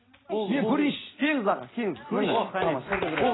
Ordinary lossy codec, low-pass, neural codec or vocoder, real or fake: AAC, 16 kbps; 7.2 kHz; none; real